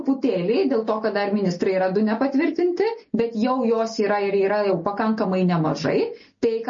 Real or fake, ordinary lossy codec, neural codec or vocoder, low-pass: real; MP3, 32 kbps; none; 7.2 kHz